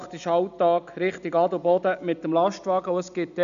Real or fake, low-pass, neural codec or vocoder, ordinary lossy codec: real; 7.2 kHz; none; none